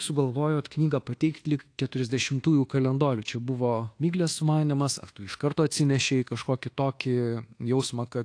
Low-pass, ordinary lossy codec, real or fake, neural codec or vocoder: 9.9 kHz; AAC, 48 kbps; fake; codec, 24 kHz, 1.2 kbps, DualCodec